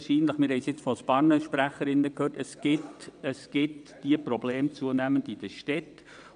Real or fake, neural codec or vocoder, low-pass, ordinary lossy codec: fake; vocoder, 22.05 kHz, 80 mel bands, WaveNeXt; 9.9 kHz; none